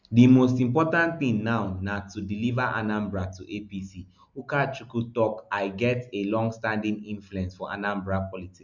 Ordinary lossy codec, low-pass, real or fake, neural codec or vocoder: none; 7.2 kHz; real; none